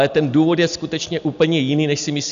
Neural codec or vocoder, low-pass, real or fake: none; 7.2 kHz; real